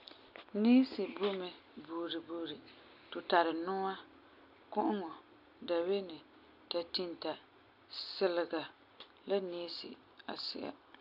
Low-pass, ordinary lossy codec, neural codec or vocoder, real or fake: 5.4 kHz; none; none; real